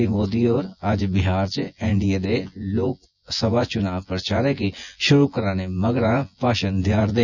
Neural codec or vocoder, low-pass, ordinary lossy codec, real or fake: vocoder, 24 kHz, 100 mel bands, Vocos; 7.2 kHz; none; fake